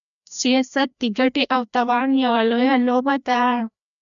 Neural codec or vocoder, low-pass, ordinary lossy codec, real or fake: codec, 16 kHz, 1 kbps, FreqCodec, larger model; 7.2 kHz; MP3, 96 kbps; fake